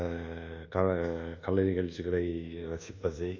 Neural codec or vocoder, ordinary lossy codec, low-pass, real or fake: codec, 24 kHz, 1.2 kbps, DualCodec; AAC, 48 kbps; 7.2 kHz; fake